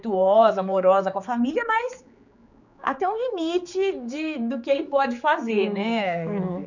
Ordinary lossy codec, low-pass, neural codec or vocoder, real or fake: none; 7.2 kHz; codec, 16 kHz, 4 kbps, X-Codec, HuBERT features, trained on general audio; fake